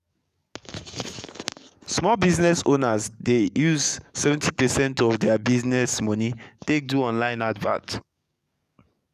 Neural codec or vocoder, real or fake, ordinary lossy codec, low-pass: codec, 44.1 kHz, 7.8 kbps, DAC; fake; none; 14.4 kHz